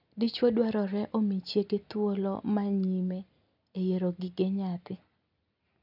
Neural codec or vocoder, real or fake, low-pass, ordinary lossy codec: none; real; 5.4 kHz; none